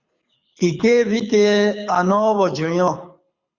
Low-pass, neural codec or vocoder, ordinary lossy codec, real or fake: 7.2 kHz; codec, 24 kHz, 6 kbps, HILCodec; Opus, 64 kbps; fake